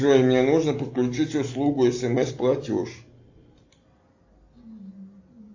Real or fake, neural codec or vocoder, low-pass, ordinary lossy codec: real; none; 7.2 kHz; AAC, 48 kbps